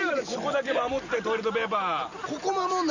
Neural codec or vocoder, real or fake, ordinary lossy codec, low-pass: none; real; none; 7.2 kHz